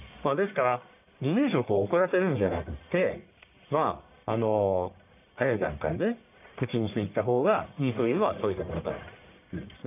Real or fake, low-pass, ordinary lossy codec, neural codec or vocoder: fake; 3.6 kHz; none; codec, 44.1 kHz, 1.7 kbps, Pupu-Codec